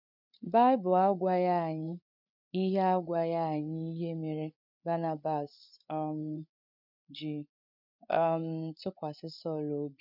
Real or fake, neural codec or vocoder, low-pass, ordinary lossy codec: fake; codec, 16 kHz, 8 kbps, FreqCodec, larger model; 5.4 kHz; none